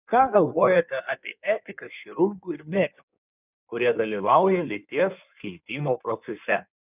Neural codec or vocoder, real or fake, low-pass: codec, 16 kHz in and 24 kHz out, 1.1 kbps, FireRedTTS-2 codec; fake; 3.6 kHz